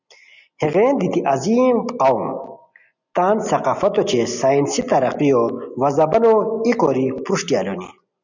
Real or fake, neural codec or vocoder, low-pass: real; none; 7.2 kHz